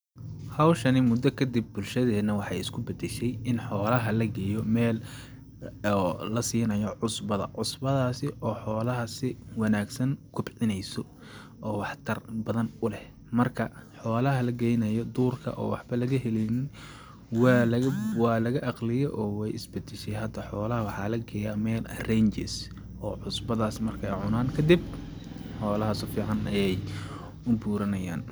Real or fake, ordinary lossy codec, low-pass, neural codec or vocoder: real; none; none; none